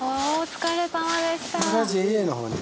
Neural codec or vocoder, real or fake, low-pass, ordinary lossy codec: none; real; none; none